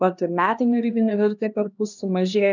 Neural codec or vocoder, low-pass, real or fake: codec, 16 kHz, 1 kbps, X-Codec, HuBERT features, trained on LibriSpeech; 7.2 kHz; fake